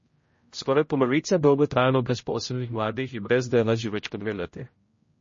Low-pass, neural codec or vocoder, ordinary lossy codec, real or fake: 7.2 kHz; codec, 16 kHz, 0.5 kbps, X-Codec, HuBERT features, trained on general audio; MP3, 32 kbps; fake